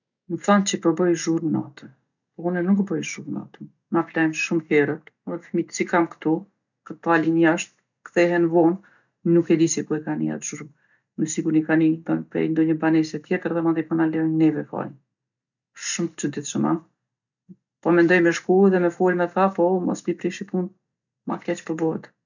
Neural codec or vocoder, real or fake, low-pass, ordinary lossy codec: none; real; 7.2 kHz; none